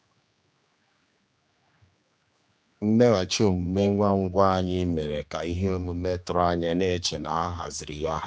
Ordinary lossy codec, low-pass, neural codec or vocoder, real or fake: none; none; codec, 16 kHz, 2 kbps, X-Codec, HuBERT features, trained on general audio; fake